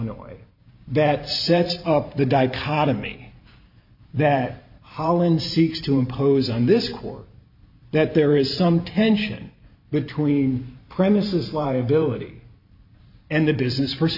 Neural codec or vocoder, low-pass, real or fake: vocoder, 44.1 kHz, 128 mel bands every 512 samples, BigVGAN v2; 5.4 kHz; fake